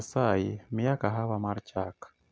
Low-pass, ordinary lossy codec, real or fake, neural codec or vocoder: none; none; real; none